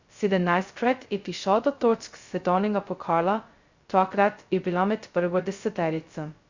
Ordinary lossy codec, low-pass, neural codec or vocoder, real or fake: Opus, 64 kbps; 7.2 kHz; codec, 16 kHz, 0.2 kbps, FocalCodec; fake